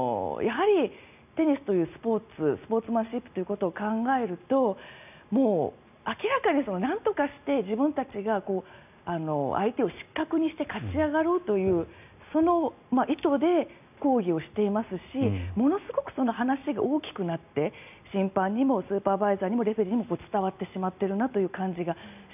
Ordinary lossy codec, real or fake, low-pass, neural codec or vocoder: none; real; 3.6 kHz; none